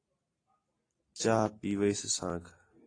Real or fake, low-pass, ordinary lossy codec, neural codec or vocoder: real; 9.9 kHz; AAC, 32 kbps; none